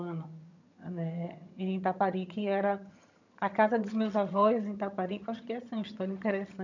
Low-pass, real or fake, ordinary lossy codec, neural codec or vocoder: 7.2 kHz; fake; none; vocoder, 22.05 kHz, 80 mel bands, HiFi-GAN